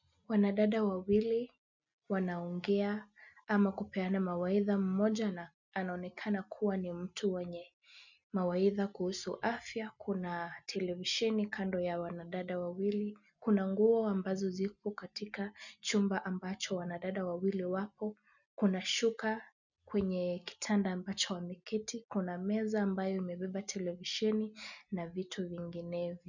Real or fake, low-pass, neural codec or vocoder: real; 7.2 kHz; none